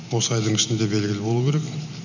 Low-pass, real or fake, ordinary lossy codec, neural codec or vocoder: 7.2 kHz; real; none; none